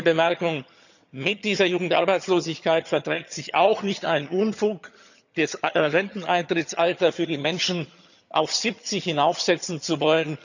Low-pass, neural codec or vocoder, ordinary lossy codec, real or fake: 7.2 kHz; vocoder, 22.05 kHz, 80 mel bands, HiFi-GAN; none; fake